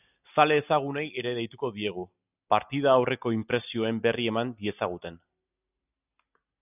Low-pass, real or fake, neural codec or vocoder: 3.6 kHz; real; none